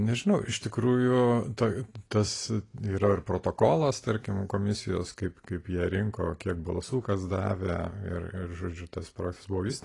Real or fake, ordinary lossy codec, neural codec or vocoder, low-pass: real; AAC, 32 kbps; none; 10.8 kHz